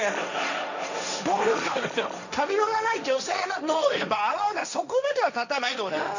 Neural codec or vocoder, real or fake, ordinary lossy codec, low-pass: codec, 16 kHz, 1.1 kbps, Voila-Tokenizer; fake; none; 7.2 kHz